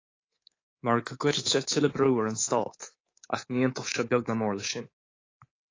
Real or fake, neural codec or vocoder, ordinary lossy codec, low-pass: fake; codec, 24 kHz, 3.1 kbps, DualCodec; AAC, 32 kbps; 7.2 kHz